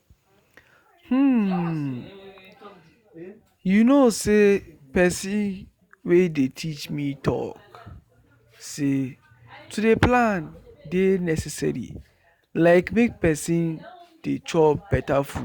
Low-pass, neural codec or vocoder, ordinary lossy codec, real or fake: 19.8 kHz; none; Opus, 64 kbps; real